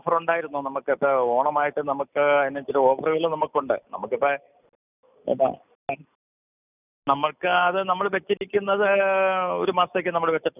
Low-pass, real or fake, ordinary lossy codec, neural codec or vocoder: 3.6 kHz; real; none; none